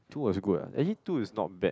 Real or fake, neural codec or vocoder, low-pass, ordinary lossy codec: real; none; none; none